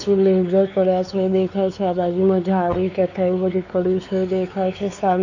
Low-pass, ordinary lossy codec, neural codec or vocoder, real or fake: 7.2 kHz; none; codec, 16 kHz, 4 kbps, X-Codec, WavLM features, trained on Multilingual LibriSpeech; fake